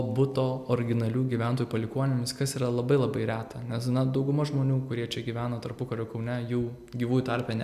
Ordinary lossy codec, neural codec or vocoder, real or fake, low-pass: AAC, 96 kbps; none; real; 14.4 kHz